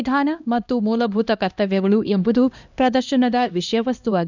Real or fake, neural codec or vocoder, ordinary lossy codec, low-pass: fake; codec, 16 kHz, 1 kbps, X-Codec, HuBERT features, trained on LibriSpeech; none; 7.2 kHz